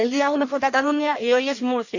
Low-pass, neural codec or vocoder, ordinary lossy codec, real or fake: 7.2 kHz; codec, 16 kHz in and 24 kHz out, 0.6 kbps, FireRedTTS-2 codec; none; fake